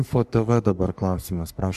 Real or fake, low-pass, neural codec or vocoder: fake; 14.4 kHz; codec, 44.1 kHz, 3.4 kbps, Pupu-Codec